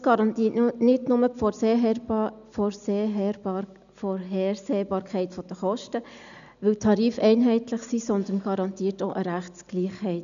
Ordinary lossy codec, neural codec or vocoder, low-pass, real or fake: none; none; 7.2 kHz; real